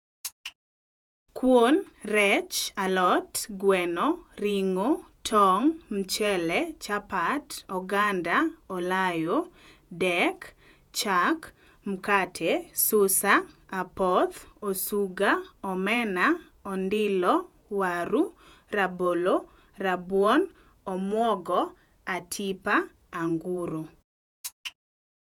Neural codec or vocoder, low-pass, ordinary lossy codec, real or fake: vocoder, 48 kHz, 128 mel bands, Vocos; 19.8 kHz; none; fake